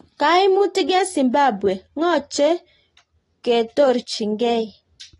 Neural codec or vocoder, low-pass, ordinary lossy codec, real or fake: none; 10.8 kHz; AAC, 32 kbps; real